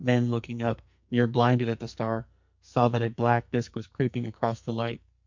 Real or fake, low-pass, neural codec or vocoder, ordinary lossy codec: fake; 7.2 kHz; codec, 44.1 kHz, 2.6 kbps, SNAC; MP3, 64 kbps